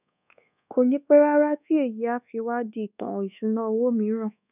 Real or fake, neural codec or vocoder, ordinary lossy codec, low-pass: fake; codec, 24 kHz, 1.2 kbps, DualCodec; none; 3.6 kHz